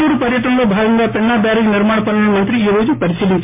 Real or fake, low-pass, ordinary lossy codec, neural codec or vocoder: real; 3.6 kHz; MP3, 24 kbps; none